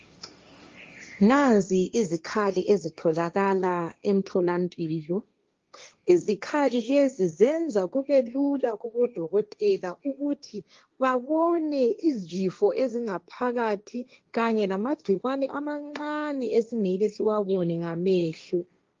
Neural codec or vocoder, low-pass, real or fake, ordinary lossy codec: codec, 16 kHz, 1.1 kbps, Voila-Tokenizer; 7.2 kHz; fake; Opus, 32 kbps